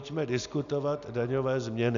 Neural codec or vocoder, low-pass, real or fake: none; 7.2 kHz; real